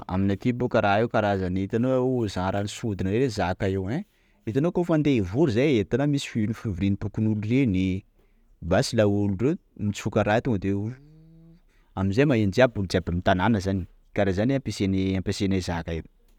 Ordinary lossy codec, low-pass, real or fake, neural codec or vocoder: none; 19.8 kHz; real; none